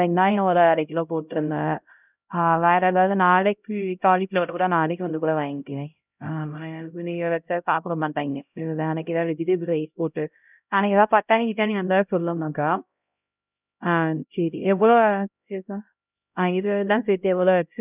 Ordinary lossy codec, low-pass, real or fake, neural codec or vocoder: none; 3.6 kHz; fake; codec, 16 kHz, 0.5 kbps, X-Codec, HuBERT features, trained on LibriSpeech